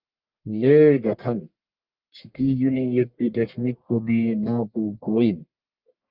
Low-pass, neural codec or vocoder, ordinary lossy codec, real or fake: 5.4 kHz; codec, 44.1 kHz, 1.7 kbps, Pupu-Codec; Opus, 24 kbps; fake